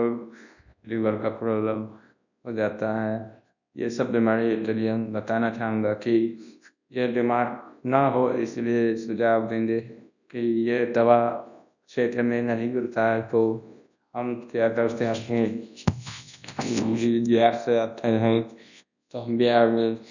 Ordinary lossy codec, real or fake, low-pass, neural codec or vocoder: none; fake; 7.2 kHz; codec, 24 kHz, 0.9 kbps, WavTokenizer, large speech release